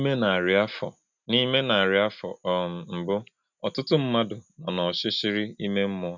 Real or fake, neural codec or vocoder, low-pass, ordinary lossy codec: real; none; 7.2 kHz; none